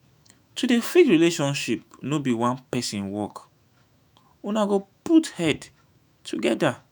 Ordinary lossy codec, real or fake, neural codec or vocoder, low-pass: none; fake; autoencoder, 48 kHz, 128 numbers a frame, DAC-VAE, trained on Japanese speech; none